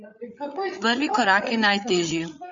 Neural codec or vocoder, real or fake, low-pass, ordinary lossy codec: codec, 16 kHz, 8 kbps, FreqCodec, larger model; fake; 7.2 kHz; MP3, 64 kbps